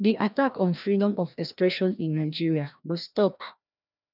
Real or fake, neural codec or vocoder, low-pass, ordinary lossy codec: fake; codec, 16 kHz, 1 kbps, FreqCodec, larger model; 5.4 kHz; none